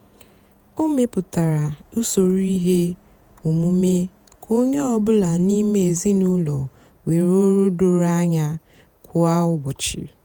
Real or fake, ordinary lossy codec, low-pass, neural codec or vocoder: fake; none; none; vocoder, 48 kHz, 128 mel bands, Vocos